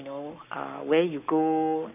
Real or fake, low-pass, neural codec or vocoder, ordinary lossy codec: fake; 3.6 kHz; codec, 44.1 kHz, 7.8 kbps, Pupu-Codec; none